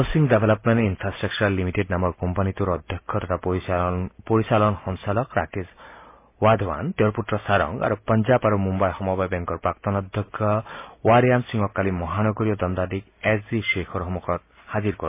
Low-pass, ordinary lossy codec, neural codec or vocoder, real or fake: 3.6 kHz; MP3, 24 kbps; none; real